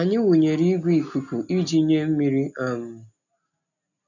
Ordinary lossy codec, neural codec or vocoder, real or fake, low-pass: none; none; real; 7.2 kHz